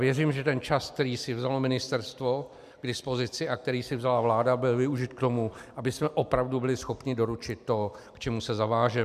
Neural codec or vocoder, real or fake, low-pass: vocoder, 44.1 kHz, 128 mel bands every 256 samples, BigVGAN v2; fake; 14.4 kHz